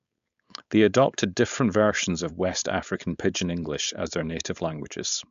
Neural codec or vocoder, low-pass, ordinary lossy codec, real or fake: codec, 16 kHz, 4.8 kbps, FACodec; 7.2 kHz; MP3, 64 kbps; fake